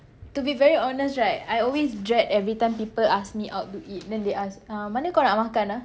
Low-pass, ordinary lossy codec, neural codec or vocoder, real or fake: none; none; none; real